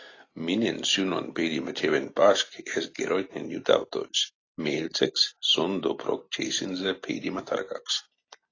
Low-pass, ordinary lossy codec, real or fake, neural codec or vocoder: 7.2 kHz; AAC, 32 kbps; real; none